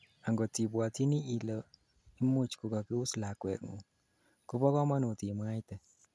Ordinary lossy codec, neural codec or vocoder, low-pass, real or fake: none; none; none; real